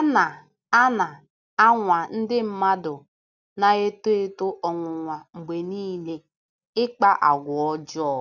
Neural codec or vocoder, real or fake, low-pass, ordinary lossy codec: none; real; 7.2 kHz; none